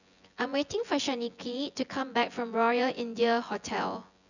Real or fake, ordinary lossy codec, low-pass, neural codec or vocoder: fake; none; 7.2 kHz; vocoder, 24 kHz, 100 mel bands, Vocos